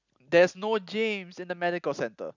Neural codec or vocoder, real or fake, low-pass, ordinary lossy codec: none; real; 7.2 kHz; none